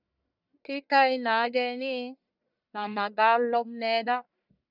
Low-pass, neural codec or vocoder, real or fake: 5.4 kHz; codec, 44.1 kHz, 1.7 kbps, Pupu-Codec; fake